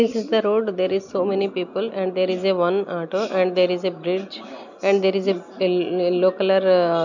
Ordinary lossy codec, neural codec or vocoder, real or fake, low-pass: none; none; real; 7.2 kHz